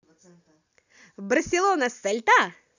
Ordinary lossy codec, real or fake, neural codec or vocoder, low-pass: none; real; none; 7.2 kHz